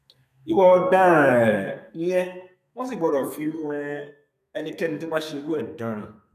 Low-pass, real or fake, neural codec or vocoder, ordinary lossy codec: 14.4 kHz; fake; codec, 32 kHz, 1.9 kbps, SNAC; none